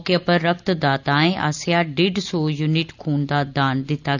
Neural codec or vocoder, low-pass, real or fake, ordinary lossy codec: none; 7.2 kHz; real; none